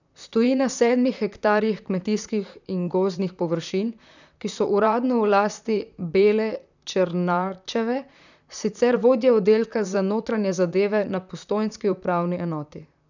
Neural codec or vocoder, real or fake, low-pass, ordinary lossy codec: vocoder, 44.1 kHz, 128 mel bands, Pupu-Vocoder; fake; 7.2 kHz; none